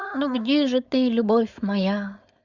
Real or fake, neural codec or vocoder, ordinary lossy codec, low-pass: fake; codec, 16 kHz, 4.8 kbps, FACodec; Opus, 64 kbps; 7.2 kHz